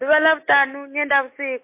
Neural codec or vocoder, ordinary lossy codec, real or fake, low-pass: none; MP3, 24 kbps; real; 3.6 kHz